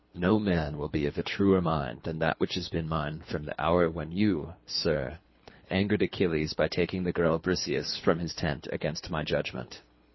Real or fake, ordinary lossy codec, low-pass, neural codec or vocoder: fake; MP3, 24 kbps; 7.2 kHz; codec, 24 kHz, 3 kbps, HILCodec